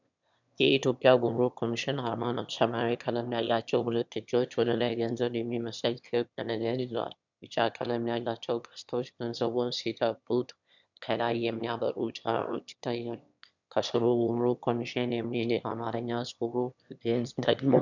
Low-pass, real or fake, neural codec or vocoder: 7.2 kHz; fake; autoencoder, 22.05 kHz, a latent of 192 numbers a frame, VITS, trained on one speaker